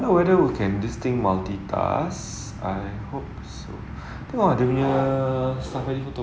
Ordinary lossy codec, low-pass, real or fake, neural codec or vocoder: none; none; real; none